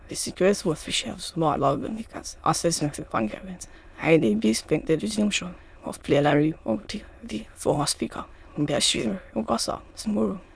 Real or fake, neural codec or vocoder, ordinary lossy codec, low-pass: fake; autoencoder, 22.05 kHz, a latent of 192 numbers a frame, VITS, trained on many speakers; none; none